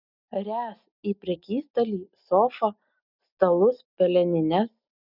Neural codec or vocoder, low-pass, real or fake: none; 5.4 kHz; real